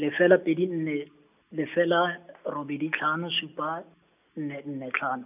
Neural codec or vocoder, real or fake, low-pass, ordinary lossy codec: none; real; 3.6 kHz; none